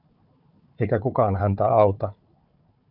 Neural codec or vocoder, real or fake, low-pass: codec, 16 kHz, 4 kbps, FunCodec, trained on Chinese and English, 50 frames a second; fake; 5.4 kHz